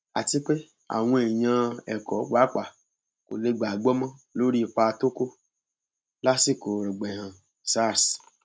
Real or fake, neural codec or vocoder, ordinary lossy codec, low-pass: real; none; none; none